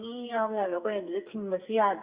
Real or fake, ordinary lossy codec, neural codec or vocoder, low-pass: fake; Opus, 64 kbps; codec, 44.1 kHz, 2.6 kbps, SNAC; 3.6 kHz